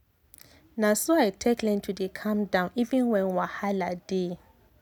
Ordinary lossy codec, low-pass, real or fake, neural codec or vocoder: none; 19.8 kHz; real; none